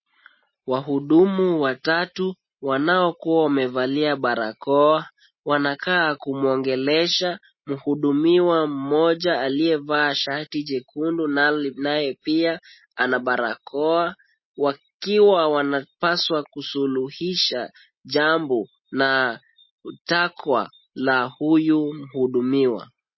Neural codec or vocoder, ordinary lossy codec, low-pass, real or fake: none; MP3, 24 kbps; 7.2 kHz; real